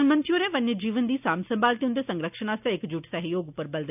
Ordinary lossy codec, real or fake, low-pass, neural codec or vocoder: none; real; 3.6 kHz; none